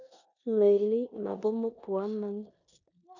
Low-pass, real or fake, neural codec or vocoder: 7.2 kHz; fake; codec, 16 kHz in and 24 kHz out, 0.9 kbps, LongCat-Audio-Codec, four codebook decoder